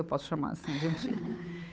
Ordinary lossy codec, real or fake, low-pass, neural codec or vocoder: none; fake; none; codec, 16 kHz, 8 kbps, FunCodec, trained on Chinese and English, 25 frames a second